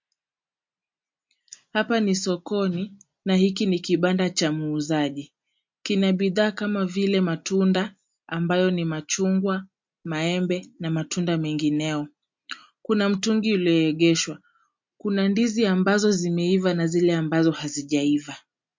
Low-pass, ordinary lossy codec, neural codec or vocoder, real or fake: 7.2 kHz; MP3, 48 kbps; none; real